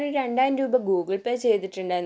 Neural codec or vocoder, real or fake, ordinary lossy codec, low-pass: none; real; none; none